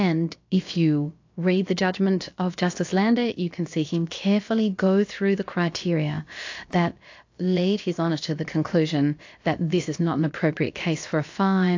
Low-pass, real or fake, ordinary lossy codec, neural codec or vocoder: 7.2 kHz; fake; AAC, 48 kbps; codec, 16 kHz, about 1 kbps, DyCAST, with the encoder's durations